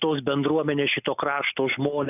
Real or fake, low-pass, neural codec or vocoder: real; 3.6 kHz; none